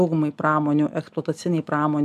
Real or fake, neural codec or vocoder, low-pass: real; none; 14.4 kHz